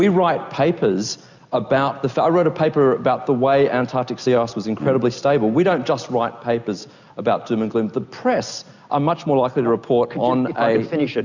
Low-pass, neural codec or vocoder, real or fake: 7.2 kHz; none; real